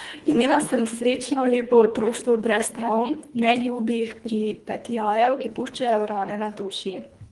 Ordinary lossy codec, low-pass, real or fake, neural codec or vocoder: Opus, 24 kbps; 10.8 kHz; fake; codec, 24 kHz, 1.5 kbps, HILCodec